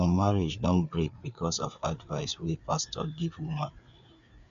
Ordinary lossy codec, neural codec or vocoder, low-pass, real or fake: none; codec, 16 kHz, 4 kbps, FreqCodec, larger model; 7.2 kHz; fake